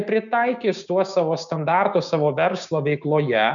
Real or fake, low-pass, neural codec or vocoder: fake; 7.2 kHz; codec, 16 kHz, 6 kbps, DAC